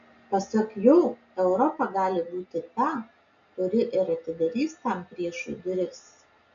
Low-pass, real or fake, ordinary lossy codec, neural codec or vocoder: 7.2 kHz; real; MP3, 64 kbps; none